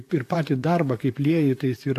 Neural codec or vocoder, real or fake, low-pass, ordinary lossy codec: vocoder, 44.1 kHz, 128 mel bands, Pupu-Vocoder; fake; 14.4 kHz; AAC, 64 kbps